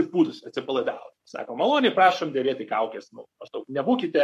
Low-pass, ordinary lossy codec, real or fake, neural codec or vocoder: 14.4 kHz; MP3, 64 kbps; fake; codec, 44.1 kHz, 7.8 kbps, Pupu-Codec